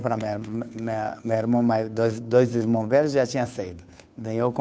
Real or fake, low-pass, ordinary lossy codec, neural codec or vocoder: fake; none; none; codec, 16 kHz, 2 kbps, FunCodec, trained on Chinese and English, 25 frames a second